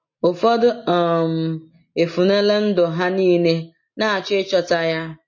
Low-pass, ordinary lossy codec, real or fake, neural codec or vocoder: 7.2 kHz; MP3, 32 kbps; real; none